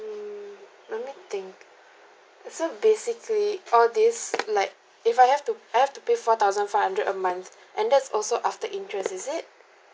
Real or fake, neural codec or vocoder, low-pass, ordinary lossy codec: real; none; none; none